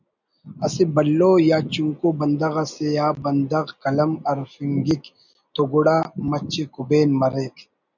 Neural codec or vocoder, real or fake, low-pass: none; real; 7.2 kHz